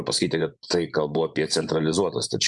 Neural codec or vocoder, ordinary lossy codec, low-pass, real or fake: vocoder, 24 kHz, 100 mel bands, Vocos; MP3, 96 kbps; 10.8 kHz; fake